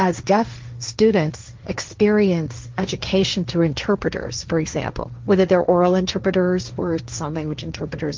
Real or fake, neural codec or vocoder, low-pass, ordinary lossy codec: fake; codec, 16 kHz, 1.1 kbps, Voila-Tokenizer; 7.2 kHz; Opus, 24 kbps